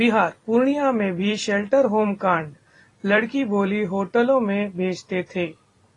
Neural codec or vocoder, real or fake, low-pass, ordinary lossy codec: vocoder, 44.1 kHz, 128 mel bands every 256 samples, BigVGAN v2; fake; 10.8 kHz; AAC, 32 kbps